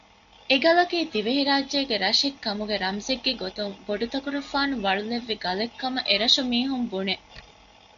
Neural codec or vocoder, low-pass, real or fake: none; 7.2 kHz; real